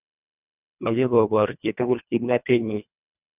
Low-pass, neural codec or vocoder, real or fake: 3.6 kHz; codec, 24 kHz, 1.5 kbps, HILCodec; fake